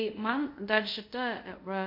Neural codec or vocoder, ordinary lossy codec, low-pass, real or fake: codec, 24 kHz, 0.5 kbps, DualCodec; MP3, 32 kbps; 5.4 kHz; fake